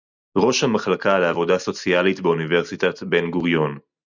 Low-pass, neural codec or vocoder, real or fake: 7.2 kHz; none; real